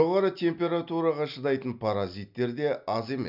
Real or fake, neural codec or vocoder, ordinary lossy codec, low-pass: real; none; none; 5.4 kHz